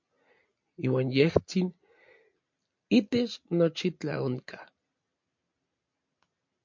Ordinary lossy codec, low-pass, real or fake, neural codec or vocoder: MP3, 48 kbps; 7.2 kHz; real; none